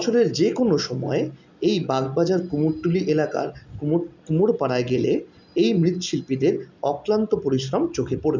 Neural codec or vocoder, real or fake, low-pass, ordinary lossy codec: none; real; 7.2 kHz; none